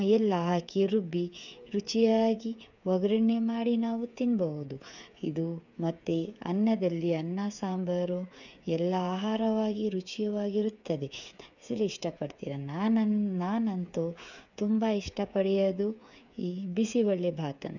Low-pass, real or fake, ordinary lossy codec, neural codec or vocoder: 7.2 kHz; fake; none; codec, 16 kHz, 8 kbps, FreqCodec, smaller model